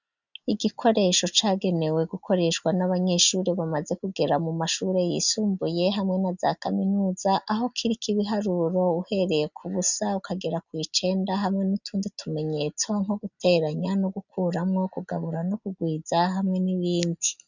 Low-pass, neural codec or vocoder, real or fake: 7.2 kHz; none; real